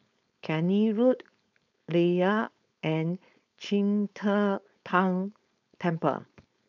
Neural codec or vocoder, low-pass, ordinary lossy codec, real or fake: codec, 16 kHz, 4.8 kbps, FACodec; 7.2 kHz; none; fake